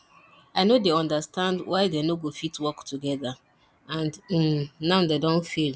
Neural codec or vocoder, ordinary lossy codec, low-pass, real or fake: none; none; none; real